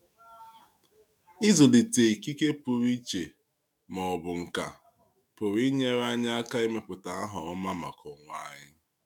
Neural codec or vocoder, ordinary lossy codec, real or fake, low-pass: autoencoder, 48 kHz, 128 numbers a frame, DAC-VAE, trained on Japanese speech; MP3, 96 kbps; fake; 19.8 kHz